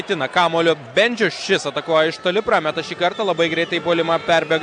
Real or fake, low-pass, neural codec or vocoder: real; 9.9 kHz; none